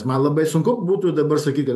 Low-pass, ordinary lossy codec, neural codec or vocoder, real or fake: 14.4 kHz; AAC, 96 kbps; vocoder, 44.1 kHz, 128 mel bands every 512 samples, BigVGAN v2; fake